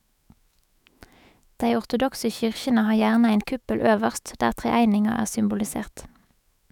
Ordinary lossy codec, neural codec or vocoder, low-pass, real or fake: none; autoencoder, 48 kHz, 128 numbers a frame, DAC-VAE, trained on Japanese speech; 19.8 kHz; fake